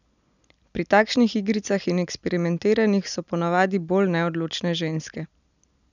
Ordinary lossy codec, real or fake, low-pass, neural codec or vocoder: none; real; 7.2 kHz; none